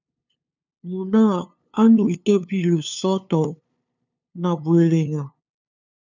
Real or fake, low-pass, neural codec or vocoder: fake; 7.2 kHz; codec, 16 kHz, 2 kbps, FunCodec, trained on LibriTTS, 25 frames a second